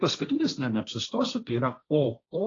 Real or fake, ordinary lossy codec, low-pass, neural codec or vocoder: fake; AAC, 32 kbps; 7.2 kHz; codec, 16 kHz, 1.1 kbps, Voila-Tokenizer